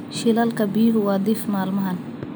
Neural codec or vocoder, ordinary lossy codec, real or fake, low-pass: none; none; real; none